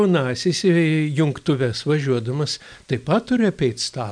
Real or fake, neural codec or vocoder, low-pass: real; none; 9.9 kHz